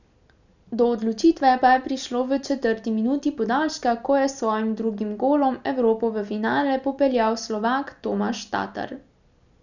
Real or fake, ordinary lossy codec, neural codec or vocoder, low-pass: real; none; none; 7.2 kHz